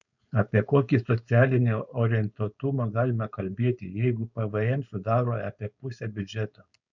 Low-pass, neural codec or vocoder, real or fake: 7.2 kHz; codec, 16 kHz, 4.8 kbps, FACodec; fake